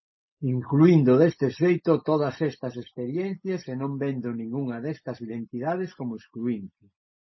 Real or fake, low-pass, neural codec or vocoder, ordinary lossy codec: real; 7.2 kHz; none; MP3, 24 kbps